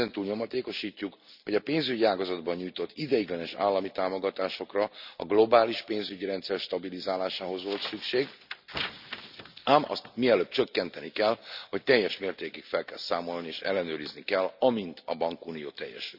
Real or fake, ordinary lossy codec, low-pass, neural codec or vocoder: real; none; 5.4 kHz; none